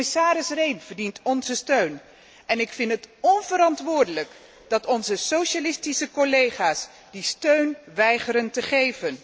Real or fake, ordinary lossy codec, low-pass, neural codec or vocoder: real; none; none; none